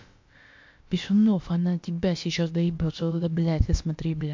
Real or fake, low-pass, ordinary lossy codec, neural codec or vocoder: fake; 7.2 kHz; MP3, 48 kbps; codec, 16 kHz, about 1 kbps, DyCAST, with the encoder's durations